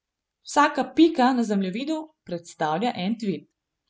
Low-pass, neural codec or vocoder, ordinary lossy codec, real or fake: none; none; none; real